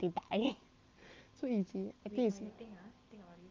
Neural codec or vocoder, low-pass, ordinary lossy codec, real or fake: none; 7.2 kHz; Opus, 24 kbps; real